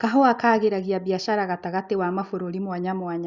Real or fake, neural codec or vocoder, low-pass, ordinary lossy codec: real; none; 7.2 kHz; Opus, 64 kbps